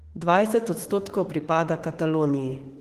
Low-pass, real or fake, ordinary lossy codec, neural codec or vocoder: 14.4 kHz; fake; Opus, 16 kbps; autoencoder, 48 kHz, 32 numbers a frame, DAC-VAE, trained on Japanese speech